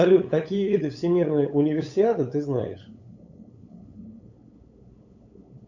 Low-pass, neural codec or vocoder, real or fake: 7.2 kHz; codec, 16 kHz, 8 kbps, FunCodec, trained on LibriTTS, 25 frames a second; fake